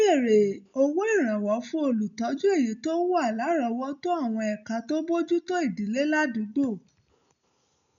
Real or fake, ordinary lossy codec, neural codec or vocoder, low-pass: real; none; none; 7.2 kHz